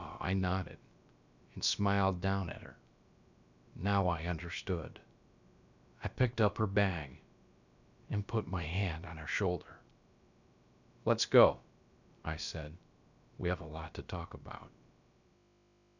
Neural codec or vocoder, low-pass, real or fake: codec, 16 kHz, about 1 kbps, DyCAST, with the encoder's durations; 7.2 kHz; fake